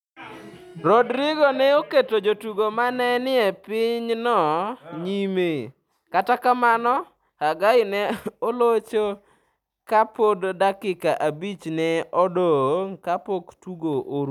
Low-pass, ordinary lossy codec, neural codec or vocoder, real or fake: 19.8 kHz; none; none; real